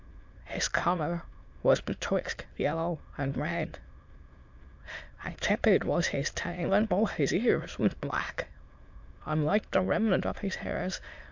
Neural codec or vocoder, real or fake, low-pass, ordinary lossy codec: autoencoder, 22.05 kHz, a latent of 192 numbers a frame, VITS, trained on many speakers; fake; 7.2 kHz; MP3, 64 kbps